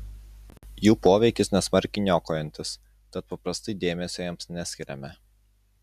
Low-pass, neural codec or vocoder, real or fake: 14.4 kHz; none; real